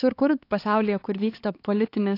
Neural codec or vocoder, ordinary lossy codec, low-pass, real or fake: codec, 16 kHz, 4 kbps, X-Codec, HuBERT features, trained on LibriSpeech; AAC, 32 kbps; 5.4 kHz; fake